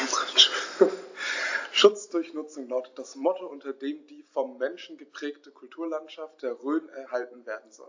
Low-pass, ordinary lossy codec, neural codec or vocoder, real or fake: 7.2 kHz; AAC, 48 kbps; none; real